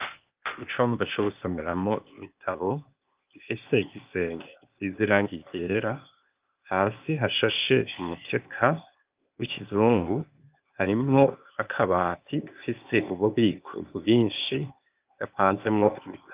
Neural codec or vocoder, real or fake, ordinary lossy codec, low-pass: codec, 16 kHz, 0.8 kbps, ZipCodec; fake; Opus, 24 kbps; 3.6 kHz